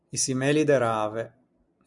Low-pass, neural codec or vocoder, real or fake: 10.8 kHz; none; real